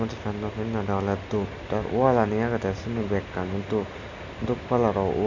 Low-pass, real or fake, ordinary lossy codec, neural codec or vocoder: 7.2 kHz; real; none; none